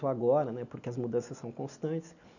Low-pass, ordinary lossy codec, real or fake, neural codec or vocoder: 7.2 kHz; none; real; none